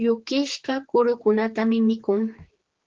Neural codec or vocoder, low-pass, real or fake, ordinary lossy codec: codec, 16 kHz, 4 kbps, X-Codec, HuBERT features, trained on general audio; 7.2 kHz; fake; Opus, 16 kbps